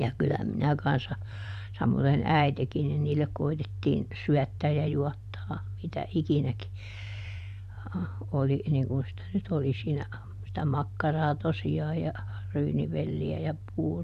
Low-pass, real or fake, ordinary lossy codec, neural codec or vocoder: 14.4 kHz; fake; none; vocoder, 44.1 kHz, 128 mel bands every 512 samples, BigVGAN v2